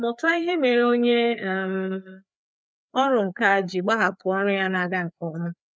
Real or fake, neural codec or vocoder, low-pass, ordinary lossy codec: fake; codec, 16 kHz, 4 kbps, FreqCodec, larger model; none; none